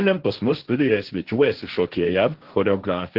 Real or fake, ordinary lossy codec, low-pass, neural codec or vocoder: fake; Opus, 16 kbps; 5.4 kHz; codec, 16 kHz, 1.1 kbps, Voila-Tokenizer